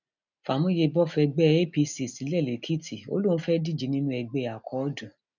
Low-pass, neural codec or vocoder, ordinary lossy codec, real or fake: 7.2 kHz; none; none; real